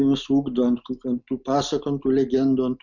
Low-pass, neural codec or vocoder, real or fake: 7.2 kHz; none; real